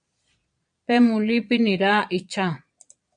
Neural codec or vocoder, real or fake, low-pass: vocoder, 22.05 kHz, 80 mel bands, Vocos; fake; 9.9 kHz